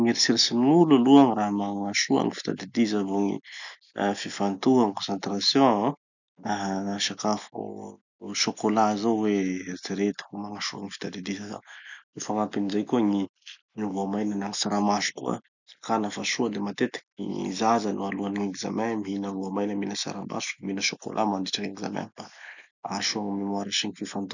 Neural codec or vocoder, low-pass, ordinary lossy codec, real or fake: none; 7.2 kHz; none; real